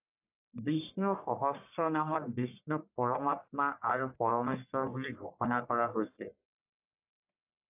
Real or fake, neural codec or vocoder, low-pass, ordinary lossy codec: fake; codec, 44.1 kHz, 1.7 kbps, Pupu-Codec; 3.6 kHz; AAC, 32 kbps